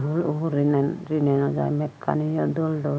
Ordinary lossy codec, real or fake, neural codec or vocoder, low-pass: none; real; none; none